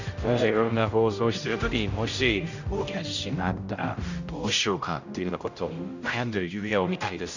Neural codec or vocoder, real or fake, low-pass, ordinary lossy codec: codec, 16 kHz, 0.5 kbps, X-Codec, HuBERT features, trained on general audio; fake; 7.2 kHz; none